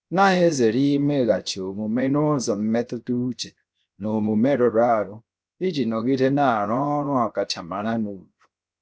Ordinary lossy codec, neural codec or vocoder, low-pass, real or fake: none; codec, 16 kHz, 0.7 kbps, FocalCodec; none; fake